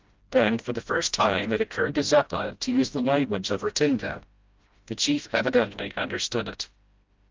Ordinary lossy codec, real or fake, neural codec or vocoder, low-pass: Opus, 24 kbps; fake; codec, 16 kHz, 0.5 kbps, FreqCodec, smaller model; 7.2 kHz